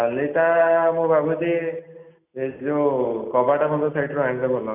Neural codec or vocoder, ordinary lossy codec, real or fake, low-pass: none; none; real; 3.6 kHz